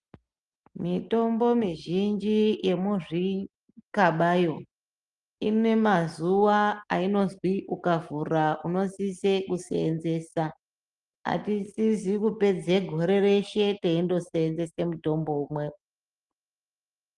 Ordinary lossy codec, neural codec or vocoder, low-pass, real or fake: Opus, 32 kbps; none; 10.8 kHz; real